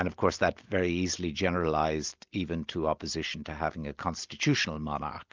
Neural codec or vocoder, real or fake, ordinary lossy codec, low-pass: none; real; Opus, 24 kbps; 7.2 kHz